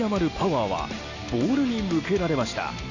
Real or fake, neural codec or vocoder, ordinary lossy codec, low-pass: real; none; none; 7.2 kHz